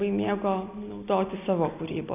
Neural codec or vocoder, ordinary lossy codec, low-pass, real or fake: none; AAC, 32 kbps; 3.6 kHz; real